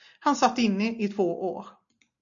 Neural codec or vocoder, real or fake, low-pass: none; real; 7.2 kHz